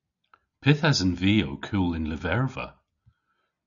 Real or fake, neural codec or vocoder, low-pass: real; none; 7.2 kHz